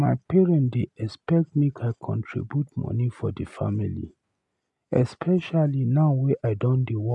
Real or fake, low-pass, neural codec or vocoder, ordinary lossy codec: real; 10.8 kHz; none; none